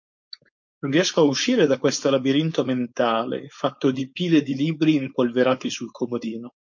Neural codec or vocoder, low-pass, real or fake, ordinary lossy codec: codec, 16 kHz, 4.8 kbps, FACodec; 7.2 kHz; fake; MP3, 48 kbps